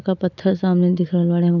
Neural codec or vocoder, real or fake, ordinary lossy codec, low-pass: none; real; none; 7.2 kHz